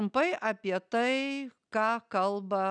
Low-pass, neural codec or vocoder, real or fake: 9.9 kHz; none; real